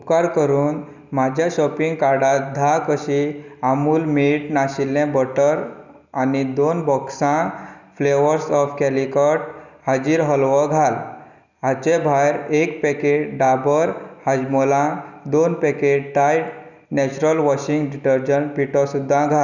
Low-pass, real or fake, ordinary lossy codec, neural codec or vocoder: 7.2 kHz; real; none; none